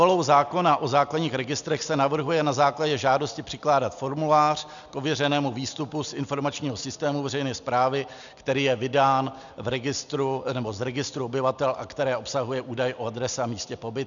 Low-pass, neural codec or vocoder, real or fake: 7.2 kHz; none; real